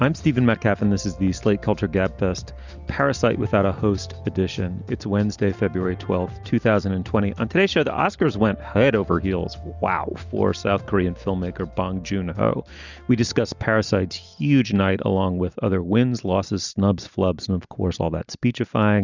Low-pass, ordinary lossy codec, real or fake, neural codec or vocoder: 7.2 kHz; Opus, 64 kbps; real; none